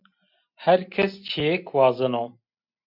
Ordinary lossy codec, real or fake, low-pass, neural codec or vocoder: MP3, 48 kbps; real; 5.4 kHz; none